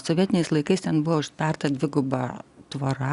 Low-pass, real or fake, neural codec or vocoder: 10.8 kHz; real; none